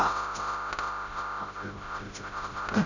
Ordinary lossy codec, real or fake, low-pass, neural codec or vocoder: none; fake; 7.2 kHz; codec, 16 kHz, 0.5 kbps, FreqCodec, smaller model